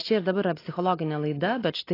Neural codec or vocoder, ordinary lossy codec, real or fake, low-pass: none; AAC, 24 kbps; real; 5.4 kHz